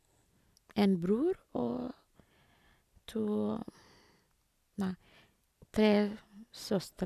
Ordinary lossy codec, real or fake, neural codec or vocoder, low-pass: none; real; none; 14.4 kHz